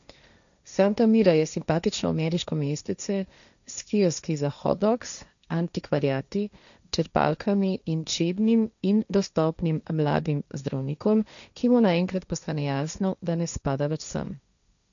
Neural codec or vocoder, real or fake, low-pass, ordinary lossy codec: codec, 16 kHz, 1.1 kbps, Voila-Tokenizer; fake; 7.2 kHz; none